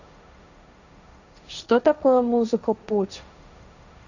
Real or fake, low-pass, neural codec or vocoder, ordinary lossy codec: fake; 7.2 kHz; codec, 16 kHz, 1.1 kbps, Voila-Tokenizer; none